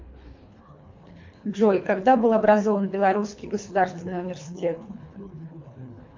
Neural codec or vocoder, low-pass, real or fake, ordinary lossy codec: codec, 24 kHz, 3 kbps, HILCodec; 7.2 kHz; fake; MP3, 48 kbps